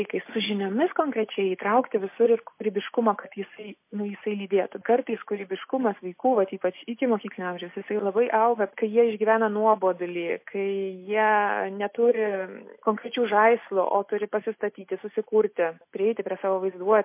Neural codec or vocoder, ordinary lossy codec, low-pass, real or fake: none; MP3, 24 kbps; 3.6 kHz; real